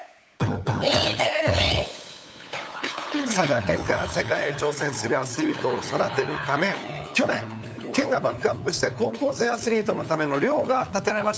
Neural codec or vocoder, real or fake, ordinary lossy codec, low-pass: codec, 16 kHz, 8 kbps, FunCodec, trained on LibriTTS, 25 frames a second; fake; none; none